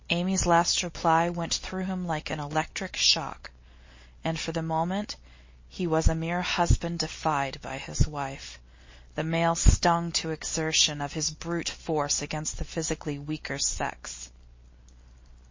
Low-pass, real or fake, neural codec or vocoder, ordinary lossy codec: 7.2 kHz; real; none; MP3, 32 kbps